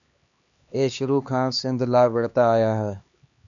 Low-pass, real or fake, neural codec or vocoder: 7.2 kHz; fake; codec, 16 kHz, 2 kbps, X-Codec, HuBERT features, trained on LibriSpeech